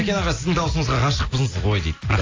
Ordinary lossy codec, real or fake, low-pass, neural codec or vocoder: AAC, 32 kbps; real; 7.2 kHz; none